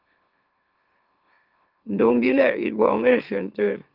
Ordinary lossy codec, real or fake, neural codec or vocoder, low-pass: Opus, 32 kbps; fake; autoencoder, 44.1 kHz, a latent of 192 numbers a frame, MeloTTS; 5.4 kHz